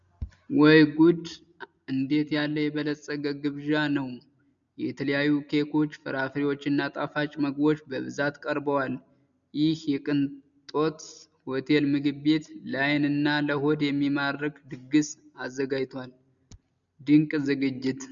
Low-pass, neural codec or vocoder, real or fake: 7.2 kHz; none; real